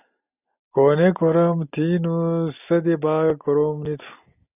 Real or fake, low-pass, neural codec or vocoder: real; 3.6 kHz; none